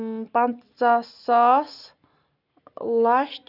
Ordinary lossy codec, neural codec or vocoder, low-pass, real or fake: none; none; 5.4 kHz; real